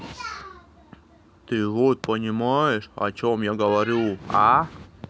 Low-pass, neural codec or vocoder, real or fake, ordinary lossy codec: none; none; real; none